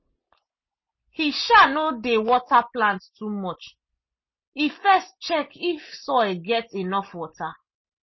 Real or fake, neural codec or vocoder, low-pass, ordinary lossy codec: real; none; 7.2 kHz; MP3, 24 kbps